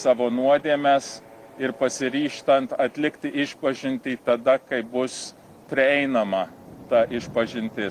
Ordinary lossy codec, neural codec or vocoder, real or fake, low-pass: Opus, 24 kbps; none; real; 14.4 kHz